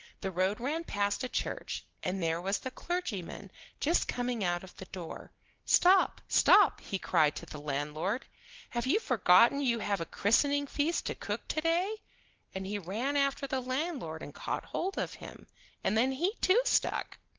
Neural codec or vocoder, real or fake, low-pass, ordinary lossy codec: none; real; 7.2 kHz; Opus, 16 kbps